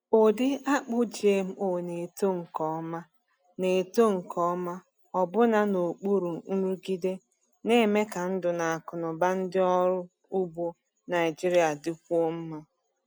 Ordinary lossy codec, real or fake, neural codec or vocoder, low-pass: none; real; none; none